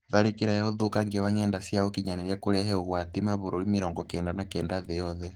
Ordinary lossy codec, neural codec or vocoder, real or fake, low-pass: Opus, 16 kbps; codec, 44.1 kHz, 7.8 kbps, Pupu-Codec; fake; 19.8 kHz